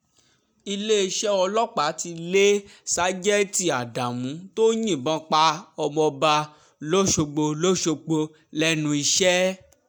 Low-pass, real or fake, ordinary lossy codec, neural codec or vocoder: none; real; none; none